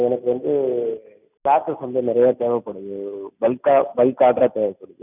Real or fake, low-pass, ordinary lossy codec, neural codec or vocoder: real; 3.6 kHz; none; none